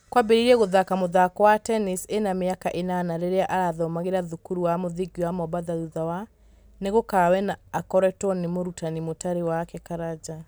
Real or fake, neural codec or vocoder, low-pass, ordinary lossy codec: real; none; none; none